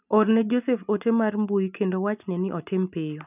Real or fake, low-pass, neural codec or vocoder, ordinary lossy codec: real; 3.6 kHz; none; none